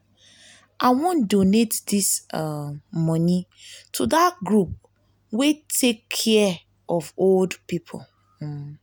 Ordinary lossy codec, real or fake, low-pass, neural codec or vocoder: none; real; none; none